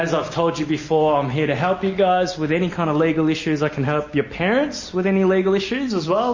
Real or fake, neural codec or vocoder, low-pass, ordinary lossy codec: real; none; 7.2 kHz; MP3, 32 kbps